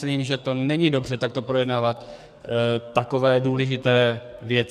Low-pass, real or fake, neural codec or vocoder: 14.4 kHz; fake; codec, 44.1 kHz, 2.6 kbps, SNAC